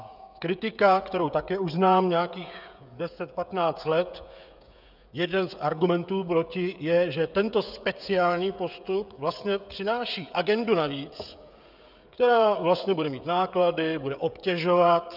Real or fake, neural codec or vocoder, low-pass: fake; codec, 16 kHz, 16 kbps, FreqCodec, smaller model; 5.4 kHz